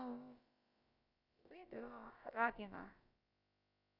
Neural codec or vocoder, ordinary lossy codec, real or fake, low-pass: codec, 16 kHz, about 1 kbps, DyCAST, with the encoder's durations; none; fake; 5.4 kHz